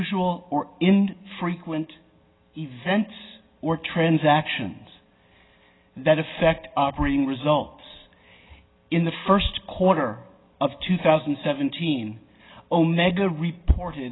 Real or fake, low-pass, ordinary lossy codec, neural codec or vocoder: real; 7.2 kHz; AAC, 16 kbps; none